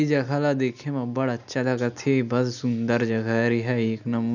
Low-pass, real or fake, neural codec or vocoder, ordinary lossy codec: 7.2 kHz; real; none; none